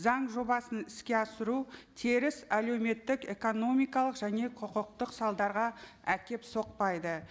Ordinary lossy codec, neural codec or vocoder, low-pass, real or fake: none; none; none; real